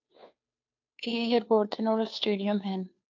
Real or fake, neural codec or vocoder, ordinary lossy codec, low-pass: fake; codec, 16 kHz, 2 kbps, FunCodec, trained on Chinese and English, 25 frames a second; AAC, 48 kbps; 7.2 kHz